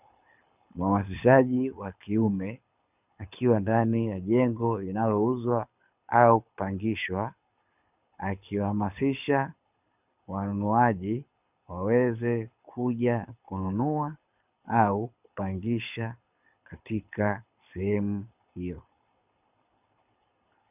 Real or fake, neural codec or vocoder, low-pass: fake; codec, 24 kHz, 6 kbps, HILCodec; 3.6 kHz